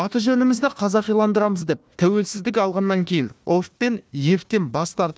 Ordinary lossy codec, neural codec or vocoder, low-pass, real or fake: none; codec, 16 kHz, 1 kbps, FunCodec, trained on Chinese and English, 50 frames a second; none; fake